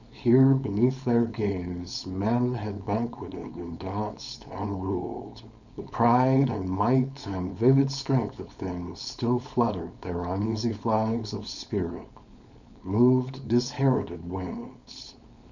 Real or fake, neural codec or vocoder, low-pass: fake; codec, 16 kHz, 4.8 kbps, FACodec; 7.2 kHz